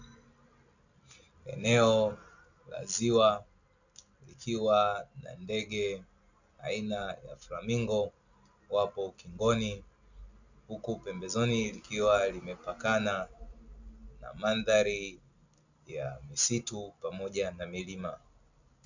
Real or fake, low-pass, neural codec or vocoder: real; 7.2 kHz; none